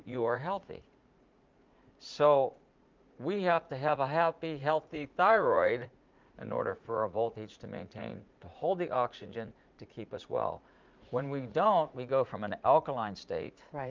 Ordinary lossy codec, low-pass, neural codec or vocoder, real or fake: Opus, 24 kbps; 7.2 kHz; vocoder, 44.1 kHz, 80 mel bands, Vocos; fake